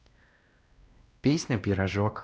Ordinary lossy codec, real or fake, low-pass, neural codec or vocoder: none; fake; none; codec, 16 kHz, 1 kbps, X-Codec, WavLM features, trained on Multilingual LibriSpeech